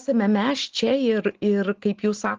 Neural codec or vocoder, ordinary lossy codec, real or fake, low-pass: none; Opus, 16 kbps; real; 7.2 kHz